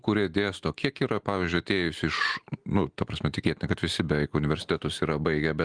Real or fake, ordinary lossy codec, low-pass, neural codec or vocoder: real; Opus, 24 kbps; 9.9 kHz; none